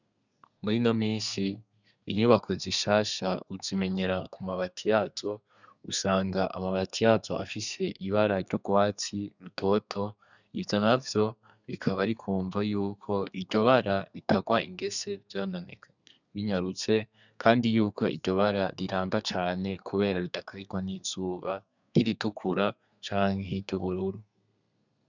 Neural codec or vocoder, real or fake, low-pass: codec, 32 kHz, 1.9 kbps, SNAC; fake; 7.2 kHz